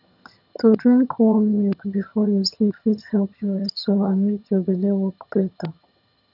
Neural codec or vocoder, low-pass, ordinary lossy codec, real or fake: vocoder, 22.05 kHz, 80 mel bands, HiFi-GAN; 5.4 kHz; none; fake